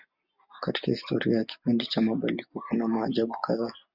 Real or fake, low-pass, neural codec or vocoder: real; 5.4 kHz; none